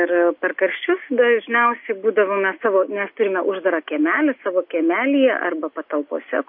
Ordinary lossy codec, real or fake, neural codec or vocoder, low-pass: MP3, 32 kbps; real; none; 5.4 kHz